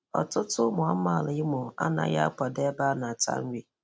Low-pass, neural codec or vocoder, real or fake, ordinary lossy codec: none; none; real; none